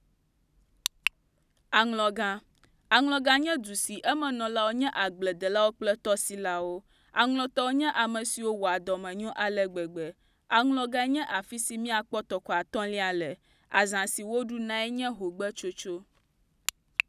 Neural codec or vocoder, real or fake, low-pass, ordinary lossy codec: none; real; 14.4 kHz; none